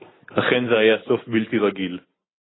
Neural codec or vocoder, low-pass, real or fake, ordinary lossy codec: none; 7.2 kHz; real; AAC, 16 kbps